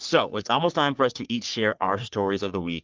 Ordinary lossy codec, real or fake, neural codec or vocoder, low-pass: Opus, 24 kbps; fake; codec, 44.1 kHz, 3.4 kbps, Pupu-Codec; 7.2 kHz